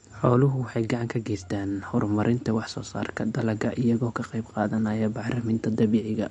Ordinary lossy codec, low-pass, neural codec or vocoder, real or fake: MP3, 48 kbps; 19.8 kHz; none; real